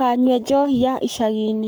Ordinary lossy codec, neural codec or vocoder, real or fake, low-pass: none; codec, 44.1 kHz, 7.8 kbps, Pupu-Codec; fake; none